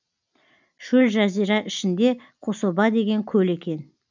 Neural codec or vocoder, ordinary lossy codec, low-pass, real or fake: none; none; 7.2 kHz; real